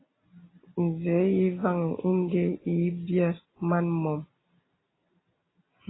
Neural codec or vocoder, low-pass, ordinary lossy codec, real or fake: none; 7.2 kHz; AAC, 16 kbps; real